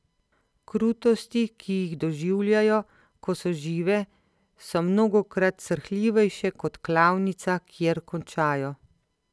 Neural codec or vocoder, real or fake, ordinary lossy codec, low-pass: none; real; none; none